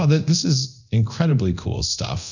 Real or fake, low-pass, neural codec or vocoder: fake; 7.2 kHz; codec, 24 kHz, 0.9 kbps, DualCodec